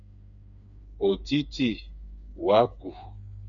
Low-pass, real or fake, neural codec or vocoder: 7.2 kHz; fake; codec, 16 kHz, 4 kbps, FreqCodec, smaller model